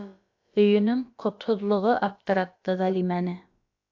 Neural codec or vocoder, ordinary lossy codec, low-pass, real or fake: codec, 16 kHz, about 1 kbps, DyCAST, with the encoder's durations; AAC, 48 kbps; 7.2 kHz; fake